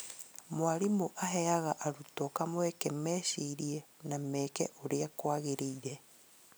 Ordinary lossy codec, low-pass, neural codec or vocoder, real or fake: none; none; none; real